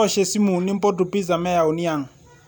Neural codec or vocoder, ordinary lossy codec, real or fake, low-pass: none; none; real; none